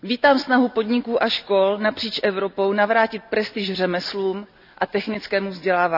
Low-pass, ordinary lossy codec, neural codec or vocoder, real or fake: 5.4 kHz; none; none; real